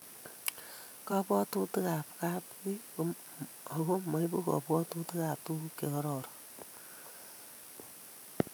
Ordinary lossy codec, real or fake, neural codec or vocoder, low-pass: none; fake; vocoder, 44.1 kHz, 128 mel bands every 512 samples, BigVGAN v2; none